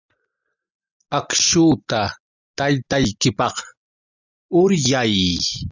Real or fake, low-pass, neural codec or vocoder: real; 7.2 kHz; none